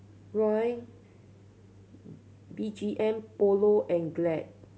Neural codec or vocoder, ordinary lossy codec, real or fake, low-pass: none; none; real; none